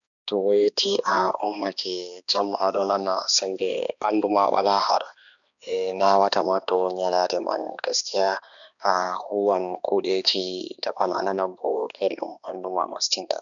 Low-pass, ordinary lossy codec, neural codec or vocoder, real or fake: 7.2 kHz; none; codec, 16 kHz, 2 kbps, X-Codec, HuBERT features, trained on balanced general audio; fake